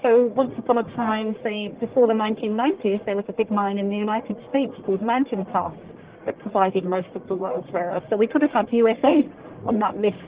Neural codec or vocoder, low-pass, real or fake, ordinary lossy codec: codec, 44.1 kHz, 1.7 kbps, Pupu-Codec; 3.6 kHz; fake; Opus, 16 kbps